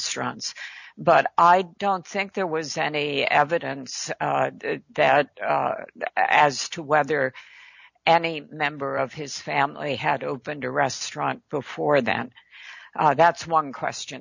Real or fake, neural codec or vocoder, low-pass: real; none; 7.2 kHz